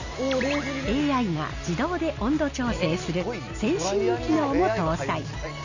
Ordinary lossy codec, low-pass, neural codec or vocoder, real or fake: none; 7.2 kHz; none; real